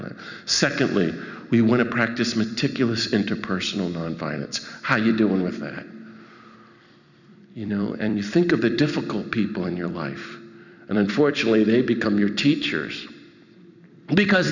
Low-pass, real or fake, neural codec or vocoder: 7.2 kHz; fake; vocoder, 44.1 kHz, 128 mel bands every 256 samples, BigVGAN v2